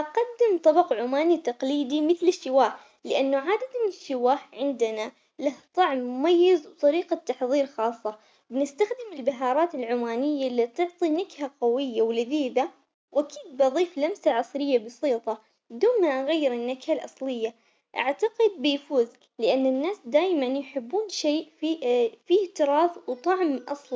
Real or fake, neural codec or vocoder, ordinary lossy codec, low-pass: real; none; none; none